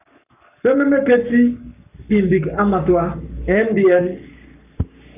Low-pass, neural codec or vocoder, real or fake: 3.6 kHz; codec, 44.1 kHz, 7.8 kbps, Pupu-Codec; fake